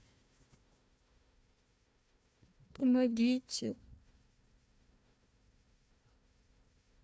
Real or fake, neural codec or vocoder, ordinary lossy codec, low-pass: fake; codec, 16 kHz, 1 kbps, FunCodec, trained on Chinese and English, 50 frames a second; none; none